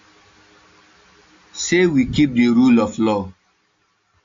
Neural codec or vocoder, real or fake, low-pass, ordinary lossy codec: none; real; 7.2 kHz; AAC, 48 kbps